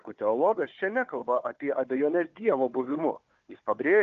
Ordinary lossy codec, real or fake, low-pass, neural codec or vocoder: Opus, 24 kbps; fake; 7.2 kHz; codec, 16 kHz, 4 kbps, FunCodec, trained on Chinese and English, 50 frames a second